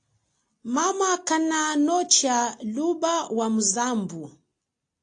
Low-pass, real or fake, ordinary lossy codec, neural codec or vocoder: 9.9 kHz; real; AAC, 48 kbps; none